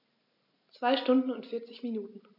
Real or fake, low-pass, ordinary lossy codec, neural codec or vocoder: real; 5.4 kHz; none; none